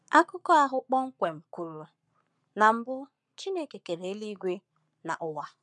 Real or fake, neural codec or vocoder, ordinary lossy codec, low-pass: fake; codec, 44.1 kHz, 7.8 kbps, Pupu-Codec; none; 10.8 kHz